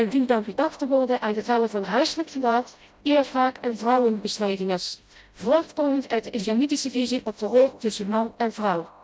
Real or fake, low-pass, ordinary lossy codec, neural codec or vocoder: fake; none; none; codec, 16 kHz, 0.5 kbps, FreqCodec, smaller model